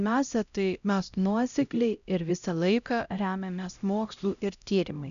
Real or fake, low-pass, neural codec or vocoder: fake; 7.2 kHz; codec, 16 kHz, 0.5 kbps, X-Codec, HuBERT features, trained on LibriSpeech